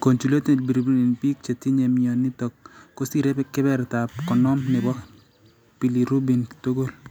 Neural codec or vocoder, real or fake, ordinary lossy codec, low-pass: none; real; none; none